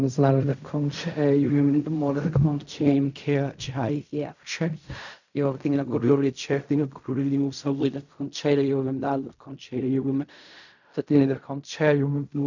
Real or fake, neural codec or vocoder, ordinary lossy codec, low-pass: fake; codec, 16 kHz in and 24 kHz out, 0.4 kbps, LongCat-Audio-Codec, fine tuned four codebook decoder; none; 7.2 kHz